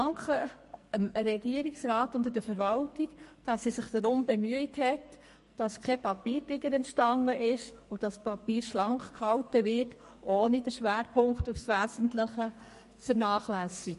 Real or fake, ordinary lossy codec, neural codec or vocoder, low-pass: fake; MP3, 48 kbps; codec, 44.1 kHz, 2.6 kbps, SNAC; 14.4 kHz